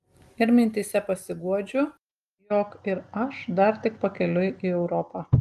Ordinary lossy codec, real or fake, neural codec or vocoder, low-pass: Opus, 32 kbps; real; none; 14.4 kHz